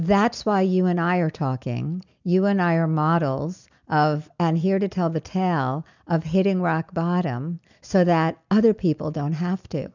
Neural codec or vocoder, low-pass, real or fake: none; 7.2 kHz; real